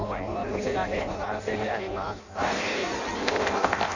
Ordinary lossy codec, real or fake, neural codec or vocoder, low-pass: none; fake; codec, 16 kHz in and 24 kHz out, 0.6 kbps, FireRedTTS-2 codec; 7.2 kHz